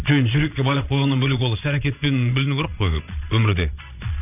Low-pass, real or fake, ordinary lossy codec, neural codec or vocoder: 3.6 kHz; fake; none; vocoder, 22.05 kHz, 80 mel bands, Vocos